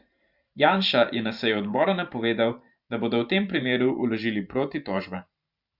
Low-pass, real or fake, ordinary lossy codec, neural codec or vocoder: 5.4 kHz; fake; Opus, 64 kbps; vocoder, 44.1 kHz, 128 mel bands every 512 samples, BigVGAN v2